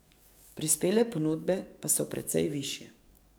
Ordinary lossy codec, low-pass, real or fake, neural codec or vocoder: none; none; fake; codec, 44.1 kHz, 7.8 kbps, DAC